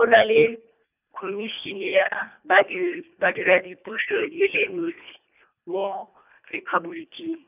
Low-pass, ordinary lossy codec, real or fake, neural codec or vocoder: 3.6 kHz; none; fake; codec, 24 kHz, 1.5 kbps, HILCodec